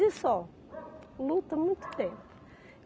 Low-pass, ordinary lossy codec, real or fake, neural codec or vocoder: none; none; real; none